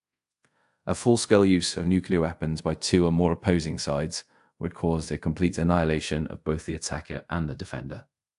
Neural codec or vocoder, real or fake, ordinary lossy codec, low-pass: codec, 24 kHz, 0.5 kbps, DualCodec; fake; AAC, 64 kbps; 10.8 kHz